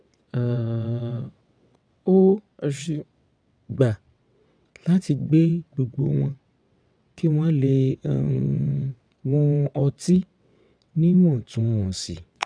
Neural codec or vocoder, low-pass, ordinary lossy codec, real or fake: vocoder, 22.05 kHz, 80 mel bands, WaveNeXt; 9.9 kHz; none; fake